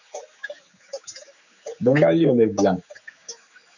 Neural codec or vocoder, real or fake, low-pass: codec, 16 kHz, 4 kbps, X-Codec, HuBERT features, trained on general audio; fake; 7.2 kHz